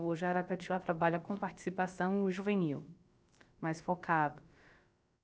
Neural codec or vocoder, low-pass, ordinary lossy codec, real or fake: codec, 16 kHz, about 1 kbps, DyCAST, with the encoder's durations; none; none; fake